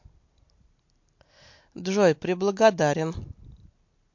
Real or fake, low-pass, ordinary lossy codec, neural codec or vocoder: real; 7.2 kHz; MP3, 48 kbps; none